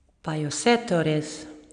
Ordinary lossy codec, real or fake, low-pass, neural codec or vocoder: none; real; 9.9 kHz; none